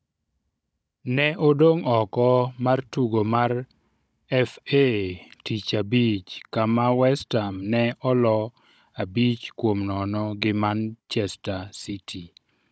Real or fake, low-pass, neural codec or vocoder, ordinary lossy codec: fake; none; codec, 16 kHz, 16 kbps, FunCodec, trained on Chinese and English, 50 frames a second; none